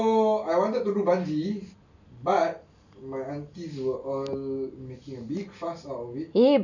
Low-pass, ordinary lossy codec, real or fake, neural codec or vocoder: 7.2 kHz; none; real; none